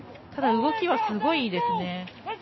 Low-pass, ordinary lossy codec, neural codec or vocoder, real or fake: 7.2 kHz; MP3, 24 kbps; none; real